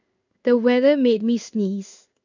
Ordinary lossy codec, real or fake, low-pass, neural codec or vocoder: none; fake; 7.2 kHz; codec, 16 kHz in and 24 kHz out, 1 kbps, XY-Tokenizer